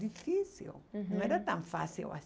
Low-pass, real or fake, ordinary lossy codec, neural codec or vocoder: none; real; none; none